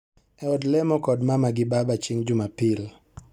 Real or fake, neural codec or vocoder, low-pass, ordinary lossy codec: real; none; 19.8 kHz; none